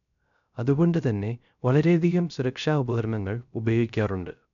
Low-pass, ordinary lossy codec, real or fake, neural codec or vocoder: 7.2 kHz; none; fake; codec, 16 kHz, 0.3 kbps, FocalCodec